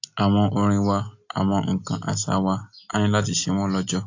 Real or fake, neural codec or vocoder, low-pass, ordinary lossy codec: real; none; 7.2 kHz; AAC, 48 kbps